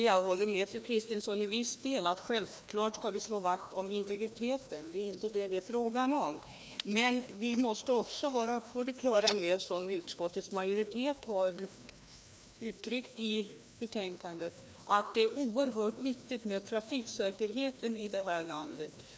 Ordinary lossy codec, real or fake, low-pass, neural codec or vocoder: none; fake; none; codec, 16 kHz, 1 kbps, FreqCodec, larger model